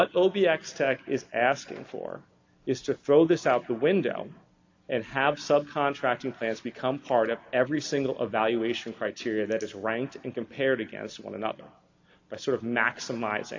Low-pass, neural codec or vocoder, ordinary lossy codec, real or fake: 7.2 kHz; none; AAC, 48 kbps; real